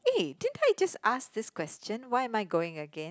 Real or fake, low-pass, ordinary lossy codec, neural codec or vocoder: real; none; none; none